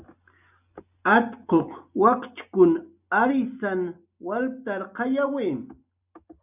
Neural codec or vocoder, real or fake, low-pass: none; real; 3.6 kHz